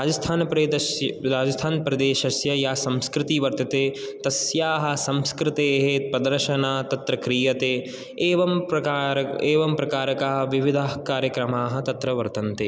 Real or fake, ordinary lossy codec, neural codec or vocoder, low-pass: real; none; none; none